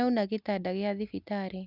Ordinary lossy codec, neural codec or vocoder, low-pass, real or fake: AAC, 48 kbps; none; 5.4 kHz; real